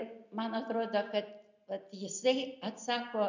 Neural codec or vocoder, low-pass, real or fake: none; 7.2 kHz; real